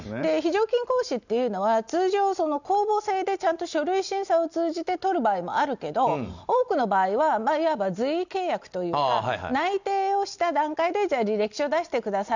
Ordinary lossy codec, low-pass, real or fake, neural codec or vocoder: none; 7.2 kHz; real; none